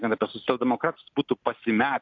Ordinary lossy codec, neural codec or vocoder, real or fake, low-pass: AAC, 48 kbps; none; real; 7.2 kHz